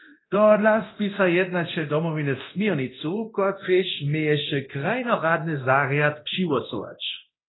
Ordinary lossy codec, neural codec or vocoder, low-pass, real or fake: AAC, 16 kbps; codec, 24 kHz, 0.9 kbps, DualCodec; 7.2 kHz; fake